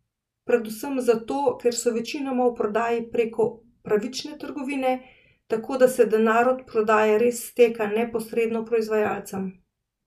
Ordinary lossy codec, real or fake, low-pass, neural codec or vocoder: none; real; 14.4 kHz; none